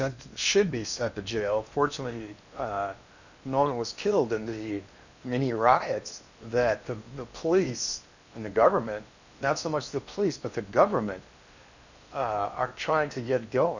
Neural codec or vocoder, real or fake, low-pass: codec, 16 kHz in and 24 kHz out, 0.8 kbps, FocalCodec, streaming, 65536 codes; fake; 7.2 kHz